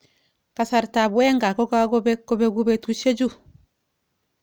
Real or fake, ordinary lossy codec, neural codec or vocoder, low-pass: real; none; none; none